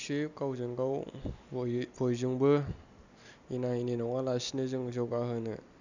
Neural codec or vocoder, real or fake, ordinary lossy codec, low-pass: none; real; none; 7.2 kHz